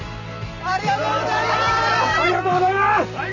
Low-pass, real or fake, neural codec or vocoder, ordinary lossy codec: 7.2 kHz; fake; vocoder, 44.1 kHz, 128 mel bands every 256 samples, BigVGAN v2; none